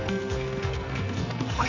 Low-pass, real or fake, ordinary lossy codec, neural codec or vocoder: 7.2 kHz; fake; AAC, 32 kbps; codec, 44.1 kHz, 2.6 kbps, SNAC